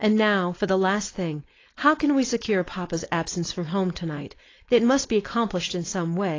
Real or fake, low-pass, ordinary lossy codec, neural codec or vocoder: fake; 7.2 kHz; AAC, 32 kbps; codec, 16 kHz, 4.8 kbps, FACodec